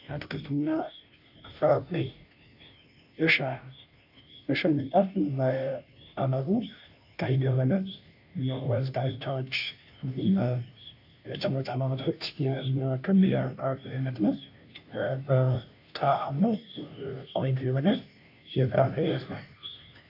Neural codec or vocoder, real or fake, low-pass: codec, 16 kHz, 0.5 kbps, FunCodec, trained on Chinese and English, 25 frames a second; fake; 5.4 kHz